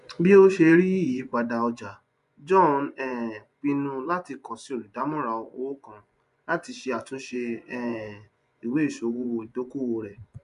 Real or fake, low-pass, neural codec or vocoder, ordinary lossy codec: fake; 10.8 kHz; vocoder, 24 kHz, 100 mel bands, Vocos; none